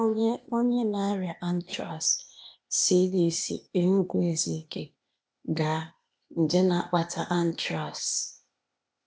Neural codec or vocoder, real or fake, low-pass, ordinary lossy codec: codec, 16 kHz, 0.8 kbps, ZipCodec; fake; none; none